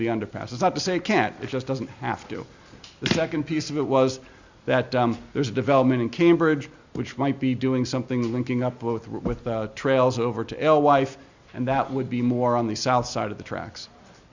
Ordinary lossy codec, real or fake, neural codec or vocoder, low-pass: Opus, 64 kbps; real; none; 7.2 kHz